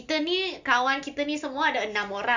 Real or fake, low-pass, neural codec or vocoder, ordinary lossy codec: real; 7.2 kHz; none; none